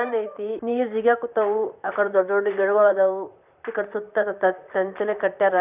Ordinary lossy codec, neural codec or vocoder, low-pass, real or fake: none; vocoder, 44.1 kHz, 128 mel bands, Pupu-Vocoder; 3.6 kHz; fake